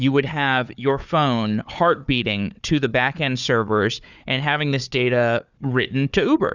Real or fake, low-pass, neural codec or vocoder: fake; 7.2 kHz; codec, 16 kHz, 4 kbps, FunCodec, trained on Chinese and English, 50 frames a second